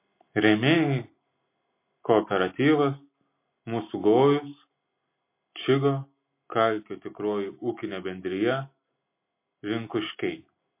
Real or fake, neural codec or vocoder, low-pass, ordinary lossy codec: real; none; 3.6 kHz; MP3, 24 kbps